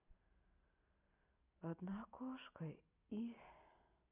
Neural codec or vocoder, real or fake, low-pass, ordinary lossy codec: none; real; 3.6 kHz; none